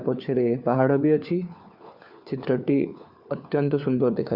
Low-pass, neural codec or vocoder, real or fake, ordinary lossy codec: 5.4 kHz; codec, 16 kHz, 4 kbps, FunCodec, trained on LibriTTS, 50 frames a second; fake; Opus, 64 kbps